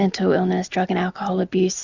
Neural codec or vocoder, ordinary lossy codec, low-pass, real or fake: none; Opus, 64 kbps; 7.2 kHz; real